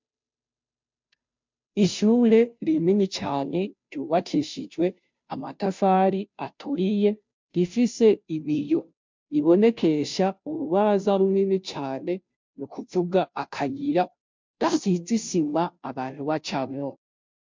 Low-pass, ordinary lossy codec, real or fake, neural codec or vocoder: 7.2 kHz; MP3, 64 kbps; fake; codec, 16 kHz, 0.5 kbps, FunCodec, trained on Chinese and English, 25 frames a second